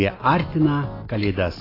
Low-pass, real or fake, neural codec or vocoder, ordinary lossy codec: 5.4 kHz; real; none; AAC, 24 kbps